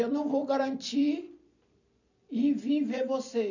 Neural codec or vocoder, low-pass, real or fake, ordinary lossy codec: vocoder, 44.1 kHz, 128 mel bands every 256 samples, BigVGAN v2; 7.2 kHz; fake; none